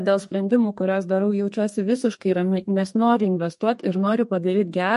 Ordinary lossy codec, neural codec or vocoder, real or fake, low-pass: MP3, 48 kbps; codec, 32 kHz, 1.9 kbps, SNAC; fake; 14.4 kHz